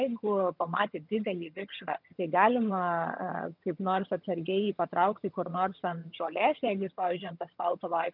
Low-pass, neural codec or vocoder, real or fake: 5.4 kHz; vocoder, 22.05 kHz, 80 mel bands, HiFi-GAN; fake